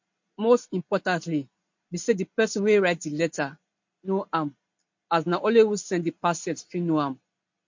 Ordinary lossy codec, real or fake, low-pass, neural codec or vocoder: MP3, 48 kbps; real; 7.2 kHz; none